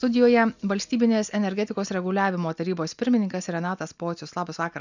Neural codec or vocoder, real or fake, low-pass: none; real; 7.2 kHz